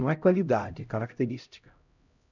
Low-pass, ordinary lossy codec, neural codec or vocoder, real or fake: 7.2 kHz; none; codec, 16 kHz, 0.5 kbps, X-Codec, HuBERT features, trained on LibriSpeech; fake